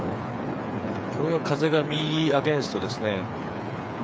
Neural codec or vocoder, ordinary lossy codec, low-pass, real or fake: codec, 16 kHz, 8 kbps, FreqCodec, smaller model; none; none; fake